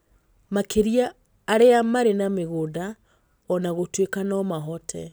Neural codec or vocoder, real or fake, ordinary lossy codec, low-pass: none; real; none; none